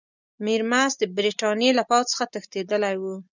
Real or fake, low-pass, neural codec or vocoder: real; 7.2 kHz; none